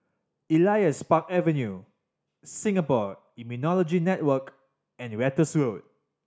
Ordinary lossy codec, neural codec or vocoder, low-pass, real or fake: none; none; none; real